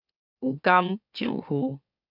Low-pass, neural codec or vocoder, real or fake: 5.4 kHz; autoencoder, 44.1 kHz, a latent of 192 numbers a frame, MeloTTS; fake